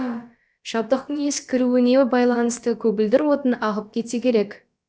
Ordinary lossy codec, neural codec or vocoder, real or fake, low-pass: none; codec, 16 kHz, about 1 kbps, DyCAST, with the encoder's durations; fake; none